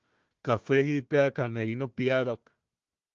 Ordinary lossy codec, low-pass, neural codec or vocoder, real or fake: Opus, 32 kbps; 7.2 kHz; codec, 16 kHz, 1 kbps, FunCodec, trained on Chinese and English, 50 frames a second; fake